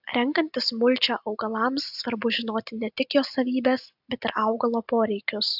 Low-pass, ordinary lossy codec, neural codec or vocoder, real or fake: 5.4 kHz; AAC, 48 kbps; none; real